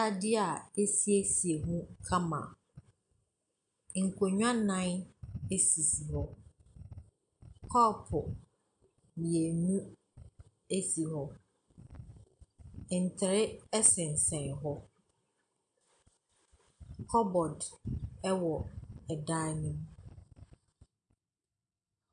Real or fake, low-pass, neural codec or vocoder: real; 9.9 kHz; none